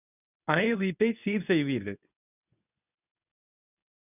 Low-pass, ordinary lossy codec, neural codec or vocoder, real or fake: 3.6 kHz; none; codec, 24 kHz, 0.9 kbps, WavTokenizer, medium speech release version 2; fake